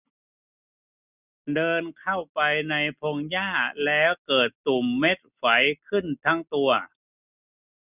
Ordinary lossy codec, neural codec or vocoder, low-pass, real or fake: none; none; 3.6 kHz; real